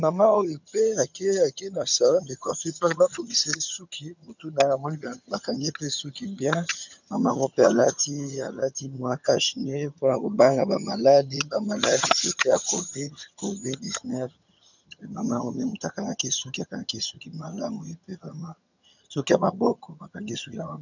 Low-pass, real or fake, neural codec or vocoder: 7.2 kHz; fake; vocoder, 22.05 kHz, 80 mel bands, HiFi-GAN